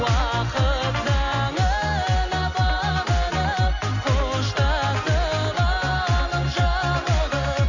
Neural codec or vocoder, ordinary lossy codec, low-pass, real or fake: none; none; 7.2 kHz; real